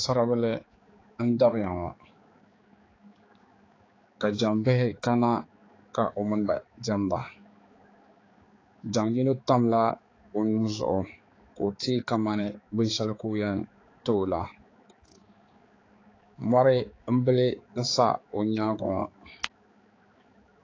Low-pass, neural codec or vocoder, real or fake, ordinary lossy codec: 7.2 kHz; codec, 16 kHz, 4 kbps, X-Codec, HuBERT features, trained on balanced general audio; fake; AAC, 32 kbps